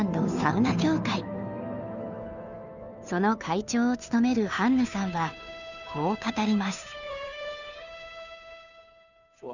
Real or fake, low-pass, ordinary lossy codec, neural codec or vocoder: fake; 7.2 kHz; none; codec, 16 kHz, 2 kbps, FunCodec, trained on Chinese and English, 25 frames a second